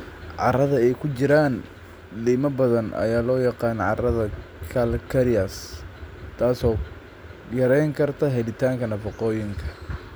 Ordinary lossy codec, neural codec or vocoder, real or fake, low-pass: none; none; real; none